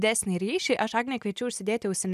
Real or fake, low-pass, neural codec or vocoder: real; 14.4 kHz; none